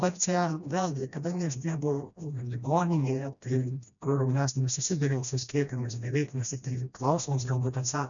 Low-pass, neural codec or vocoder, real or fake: 7.2 kHz; codec, 16 kHz, 1 kbps, FreqCodec, smaller model; fake